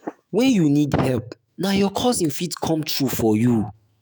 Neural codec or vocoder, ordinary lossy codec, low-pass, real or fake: autoencoder, 48 kHz, 128 numbers a frame, DAC-VAE, trained on Japanese speech; none; none; fake